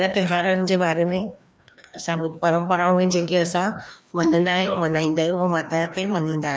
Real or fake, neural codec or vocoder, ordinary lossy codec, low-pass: fake; codec, 16 kHz, 1 kbps, FreqCodec, larger model; none; none